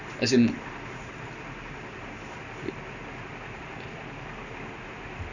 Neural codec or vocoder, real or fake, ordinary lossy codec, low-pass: none; real; none; 7.2 kHz